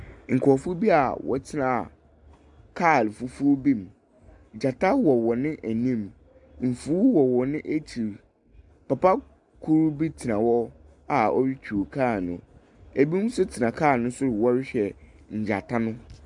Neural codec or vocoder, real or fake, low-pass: none; real; 10.8 kHz